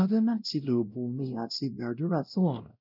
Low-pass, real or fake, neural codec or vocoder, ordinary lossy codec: 5.4 kHz; fake; codec, 16 kHz, 0.5 kbps, X-Codec, WavLM features, trained on Multilingual LibriSpeech; none